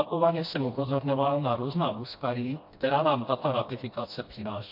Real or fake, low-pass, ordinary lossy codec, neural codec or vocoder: fake; 5.4 kHz; MP3, 32 kbps; codec, 16 kHz, 1 kbps, FreqCodec, smaller model